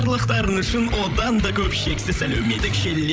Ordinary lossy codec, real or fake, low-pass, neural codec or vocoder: none; fake; none; codec, 16 kHz, 16 kbps, FreqCodec, larger model